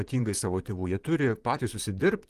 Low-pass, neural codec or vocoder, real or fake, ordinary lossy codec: 14.4 kHz; vocoder, 44.1 kHz, 128 mel bands, Pupu-Vocoder; fake; Opus, 24 kbps